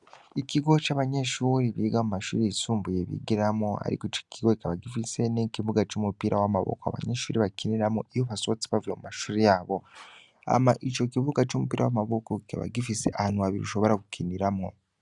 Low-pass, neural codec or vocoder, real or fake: 10.8 kHz; none; real